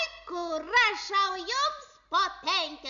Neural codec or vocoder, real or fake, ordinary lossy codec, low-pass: none; real; MP3, 96 kbps; 7.2 kHz